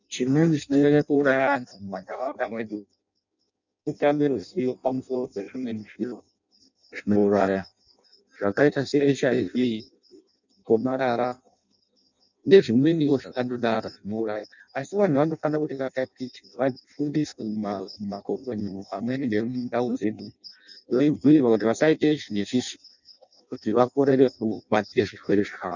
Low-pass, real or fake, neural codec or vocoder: 7.2 kHz; fake; codec, 16 kHz in and 24 kHz out, 0.6 kbps, FireRedTTS-2 codec